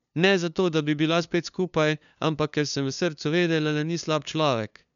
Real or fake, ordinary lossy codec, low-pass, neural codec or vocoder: fake; none; 7.2 kHz; codec, 16 kHz, 2 kbps, FunCodec, trained on LibriTTS, 25 frames a second